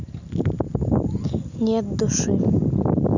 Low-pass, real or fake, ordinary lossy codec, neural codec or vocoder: 7.2 kHz; real; none; none